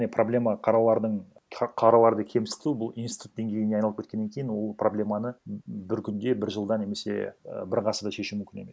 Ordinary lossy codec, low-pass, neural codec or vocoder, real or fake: none; none; none; real